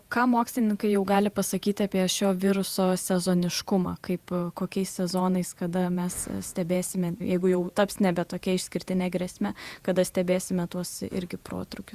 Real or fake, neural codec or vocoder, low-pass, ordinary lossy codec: fake; vocoder, 48 kHz, 128 mel bands, Vocos; 14.4 kHz; Opus, 64 kbps